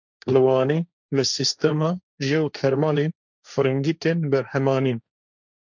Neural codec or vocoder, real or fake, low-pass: codec, 16 kHz, 1.1 kbps, Voila-Tokenizer; fake; 7.2 kHz